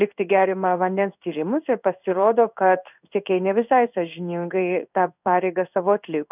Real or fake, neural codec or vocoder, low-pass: fake; codec, 16 kHz in and 24 kHz out, 1 kbps, XY-Tokenizer; 3.6 kHz